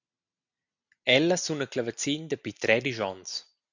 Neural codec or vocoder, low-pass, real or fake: none; 7.2 kHz; real